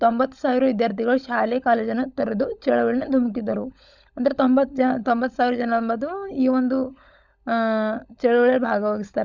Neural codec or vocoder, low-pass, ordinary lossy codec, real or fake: codec, 16 kHz, 16 kbps, FunCodec, trained on LibriTTS, 50 frames a second; 7.2 kHz; none; fake